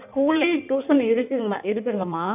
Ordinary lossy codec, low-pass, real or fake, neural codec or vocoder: none; 3.6 kHz; fake; codec, 16 kHz in and 24 kHz out, 1.1 kbps, FireRedTTS-2 codec